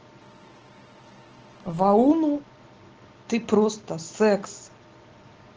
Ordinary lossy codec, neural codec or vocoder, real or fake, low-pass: Opus, 16 kbps; none; real; 7.2 kHz